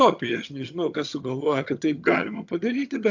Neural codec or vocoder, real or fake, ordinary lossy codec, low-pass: vocoder, 22.05 kHz, 80 mel bands, HiFi-GAN; fake; AAC, 48 kbps; 7.2 kHz